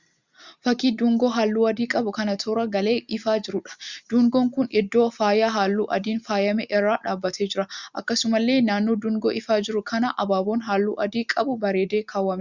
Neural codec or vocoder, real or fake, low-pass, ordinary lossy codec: none; real; 7.2 kHz; Opus, 64 kbps